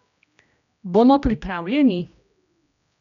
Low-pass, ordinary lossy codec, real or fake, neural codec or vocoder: 7.2 kHz; none; fake; codec, 16 kHz, 1 kbps, X-Codec, HuBERT features, trained on general audio